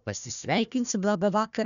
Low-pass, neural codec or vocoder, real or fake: 7.2 kHz; codec, 16 kHz, 1 kbps, FunCodec, trained on Chinese and English, 50 frames a second; fake